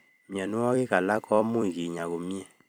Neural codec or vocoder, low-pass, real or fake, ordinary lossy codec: vocoder, 44.1 kHz, 128 mel bands every 256 samples, BigVGAN v2; none; fake; none